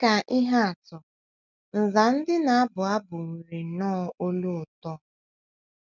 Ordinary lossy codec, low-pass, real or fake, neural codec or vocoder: none; 7.2 kHz; real; none